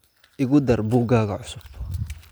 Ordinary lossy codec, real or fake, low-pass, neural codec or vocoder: none; real; none; none